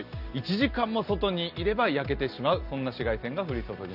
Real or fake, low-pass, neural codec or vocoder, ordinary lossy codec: real; 5.4 kHz; none; none